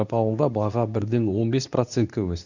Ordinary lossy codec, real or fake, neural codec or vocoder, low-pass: none; fake; codec, 24 kHz, 0.9 kbps, WavTokenizer, medium speech release version 2; 7.2 kHz